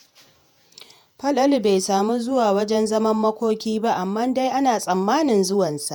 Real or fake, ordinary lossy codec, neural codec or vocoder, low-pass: fake; none; vocoder, 48 kHz, 128 mel bands, Vocos; none